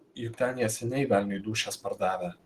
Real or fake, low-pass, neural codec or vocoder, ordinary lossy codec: real; 14.4 kHz; none; Opus, 16 kbps